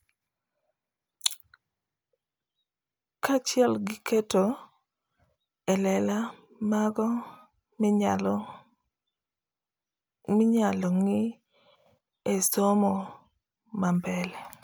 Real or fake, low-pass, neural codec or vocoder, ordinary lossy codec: real; none; none; none